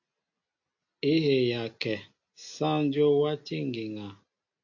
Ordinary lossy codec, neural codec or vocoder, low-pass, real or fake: AAC, 48 kbps; none; 7.2 kHz; real